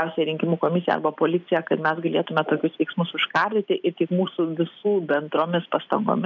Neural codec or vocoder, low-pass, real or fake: none; 7.2 kHz; real